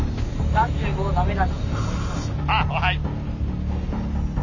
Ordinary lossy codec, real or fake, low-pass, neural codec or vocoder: none; real; 7.2 kHz; none